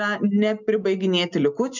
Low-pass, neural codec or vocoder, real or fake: 7.2 kHz; none; real